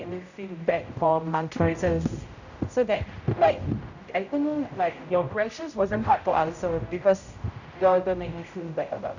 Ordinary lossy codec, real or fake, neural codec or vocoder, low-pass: none; fake; codec, 16 kHz, 0.5 kbps, X-Codec, HuBERT features, trained on general audio; 7.2 kHz